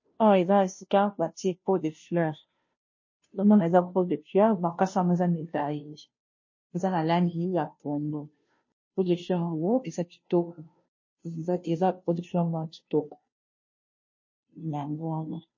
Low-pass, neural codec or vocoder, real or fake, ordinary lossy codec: 7.2 kHz; codec, 16 kHz, 0.5 kbps, FunCodec, trained on Chinese and English, 25 frames a second; fake; MP3, 32 kbps